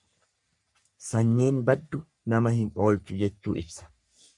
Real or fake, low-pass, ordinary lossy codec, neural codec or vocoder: fake; 10.8 kHz; MP3, 64 kbps; codec, 44.1 kHz, 3.4 kbps, Pupu-Codec